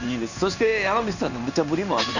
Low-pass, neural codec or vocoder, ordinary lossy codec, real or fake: 7.2 kHz; codec, 16 kHz in and 24 kHz out, 1 kbps, XY-Tokenizer; none; fake